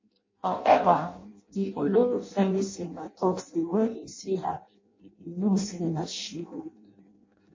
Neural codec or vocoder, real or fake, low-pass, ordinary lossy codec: codec, 16 kHz in and 24 kHz out, 0.6 kbps, FireRedTTS-2 codec; fake; 7.2 kHz; MP3, 32 kbps